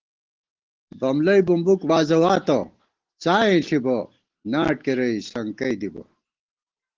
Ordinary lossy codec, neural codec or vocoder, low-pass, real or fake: Opus, 16 kbps; none; 7.2 kHz; real